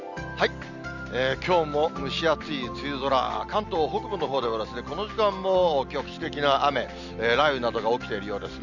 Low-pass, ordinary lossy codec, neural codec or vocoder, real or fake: 7.2 kHz; none; none; real